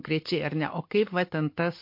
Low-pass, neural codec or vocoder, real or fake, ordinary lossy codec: 5.4 kHz; none; real; MP3, 32 kbps